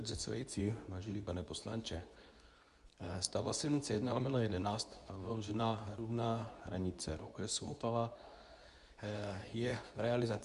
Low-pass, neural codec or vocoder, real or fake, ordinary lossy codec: 10.8 kHz; codec, 24 kHz, 0.9 kbps, WavTokenizer, medium speech release version 2; fake; Opus, 64 kbps